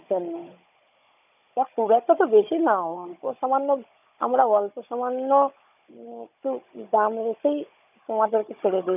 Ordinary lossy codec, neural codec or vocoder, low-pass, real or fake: none; codec, 16 kHz, 16 kbps, FunCodec, trained on Chinese and English, 50 frames a second; 3.6 kHz; fake